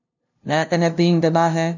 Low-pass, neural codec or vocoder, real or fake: 7.2 kHz; codec, 16 kHz, 0.5 kbps, FunCodec, trained on LibriTTS, 25 frames a second; fake